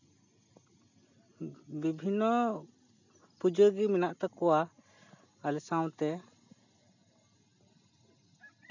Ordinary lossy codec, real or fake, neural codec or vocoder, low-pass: none; real; none; 7.2 kHz